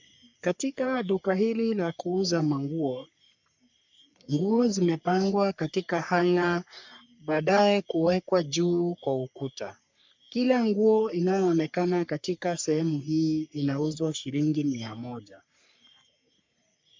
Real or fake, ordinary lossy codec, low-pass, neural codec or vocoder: fake; MP3, 64 kbps; 7.2 kHz; codec, 44.1 kHz, 3.4 kbps, Pupu-Codec